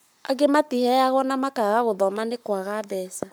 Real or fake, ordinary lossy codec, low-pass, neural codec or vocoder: fake; none; none; codec, 44.1 kHz, 7.8 kbps, Pupu-Codec